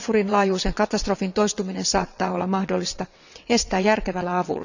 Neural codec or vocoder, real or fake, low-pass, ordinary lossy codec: vocoder, 22.05 kHz, 80 mel bands, WaveNeXt; fake; 7.2 kHz; none